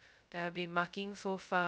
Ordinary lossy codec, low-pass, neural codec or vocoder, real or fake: none; none; codec, 16 kHz, 0.2 kbps, FocalCodec; fake